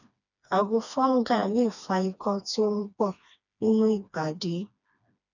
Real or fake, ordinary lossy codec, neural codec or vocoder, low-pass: fake; none; codec, 16 kHz, 2 kbps, FreqCodec, smaller model; 7.2 kHz